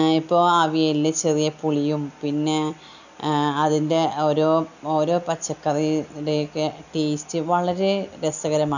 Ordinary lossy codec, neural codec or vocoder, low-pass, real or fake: none; none; 7.2 kHz; real